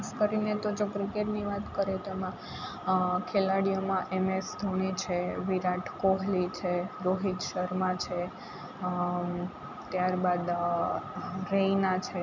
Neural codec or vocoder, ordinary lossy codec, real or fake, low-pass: none; none; real; 7.2 kHz